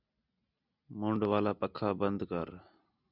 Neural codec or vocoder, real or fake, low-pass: none; real; 5.4 kHz